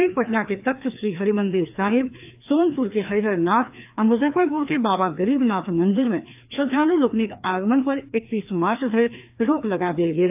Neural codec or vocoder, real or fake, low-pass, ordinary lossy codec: codec, 16 kHz, 2 kbps, FreqCodec, larger model; fake; 3.6 kHz; AAC, 32 kbps